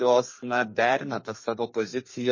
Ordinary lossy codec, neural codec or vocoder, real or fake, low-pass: MP3, 32 kbps; codec, 32 kHz, 1.9 kbps, SNAC; fake; 7.2 kHz